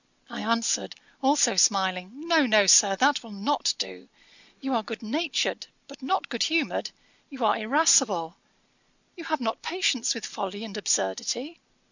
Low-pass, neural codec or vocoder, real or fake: 7.2 kHz; none; real